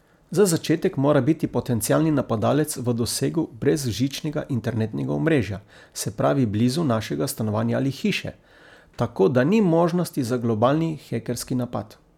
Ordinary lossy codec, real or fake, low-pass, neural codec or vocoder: none; real; 19.8 kHz; none